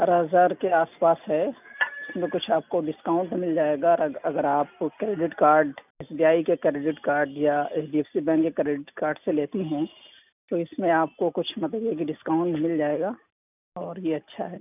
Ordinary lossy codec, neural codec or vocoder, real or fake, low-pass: none; none; real; 3.6 kHz